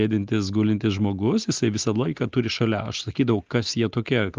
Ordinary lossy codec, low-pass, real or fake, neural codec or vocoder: Opus, 24 kbps; 7.2 kHz; real; none